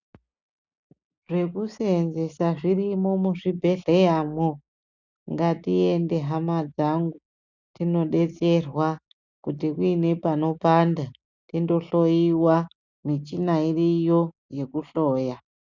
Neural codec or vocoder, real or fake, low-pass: none; real; 7.2 kHz